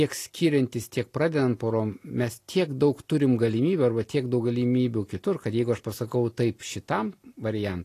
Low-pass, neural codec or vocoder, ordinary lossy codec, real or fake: 14.4 kHz; none; AAC, 48 kbps; real